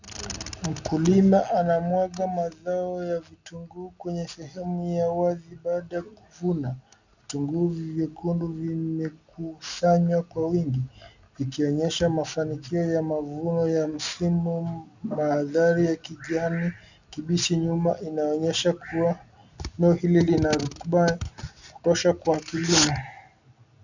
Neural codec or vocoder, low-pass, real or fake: none; 7.2 kHz; real